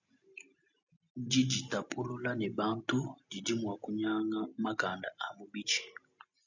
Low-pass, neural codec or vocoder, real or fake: 7.2 kHz; none; real